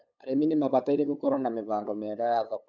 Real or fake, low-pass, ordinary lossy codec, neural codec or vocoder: fake; 7.2 kHz; none; codec, 16 kHz, 8 kbps, FunCodec, trained on LibriTTS, 25 frames a second